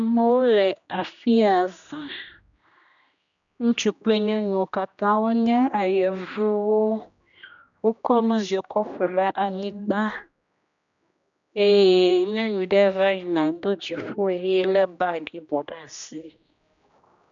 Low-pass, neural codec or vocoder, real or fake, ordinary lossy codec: 7.2 kHz; codec, 16 kHz, 1 kbps, X-Codec, HuBERT features, trained on general audio; fake; MP3, 96 kbps